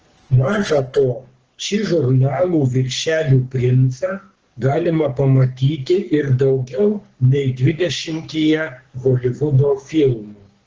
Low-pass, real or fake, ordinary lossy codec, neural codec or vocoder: 7.2 kHz; fake; Opus, 16 kbps; codec, 44.1 kHz, 3.4 kbps, Pupu-Codec